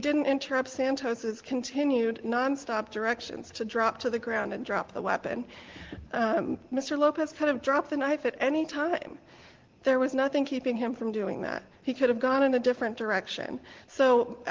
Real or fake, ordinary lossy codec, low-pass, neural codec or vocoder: real; Opus, 16 kbps; 7.2 kHz; none